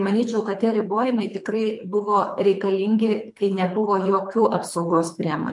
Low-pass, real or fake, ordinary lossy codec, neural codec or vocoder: 10.8 kHz; fake; MP3, 64 kbps; codec, 24 kHz, 3 kbps, HILCodec